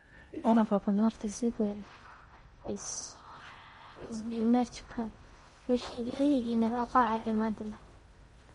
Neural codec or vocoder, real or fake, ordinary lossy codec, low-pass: codec, 16 kHz in and 24 kHz out, 0.6 kbps, FocalCodec, streaming, 4096 codes; fake; MP3, 48 kbps; 10.8 kHz